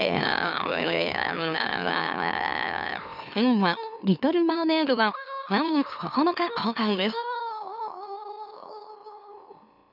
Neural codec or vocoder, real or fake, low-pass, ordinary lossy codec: autoencoder, 44.1 kHz, a latent of 192 numbers a frame, MeloTTS; fake; 5.4 kHz; none